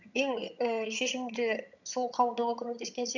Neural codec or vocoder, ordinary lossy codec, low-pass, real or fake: vocoder, 22.05 kHz, 80 mel bands, HiFi-GAN; none; 7.2 kHz; fake